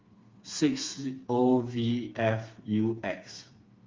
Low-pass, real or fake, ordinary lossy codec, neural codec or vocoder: 7.2 kHz; fake; Opus, 32 kbps; codec, 16 kHz, 4 kbps, FreqCodec, smaller model